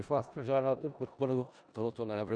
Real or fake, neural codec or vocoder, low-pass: fake; codec, 16 kHz in and 24 kHz out, 0.4 kbps, LongCat-Audio-Codec, four codebook decoder; 9.9 kHz